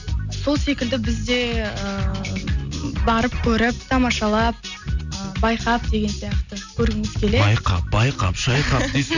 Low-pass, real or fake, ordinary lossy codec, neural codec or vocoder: 7.2 kHz; real; none; none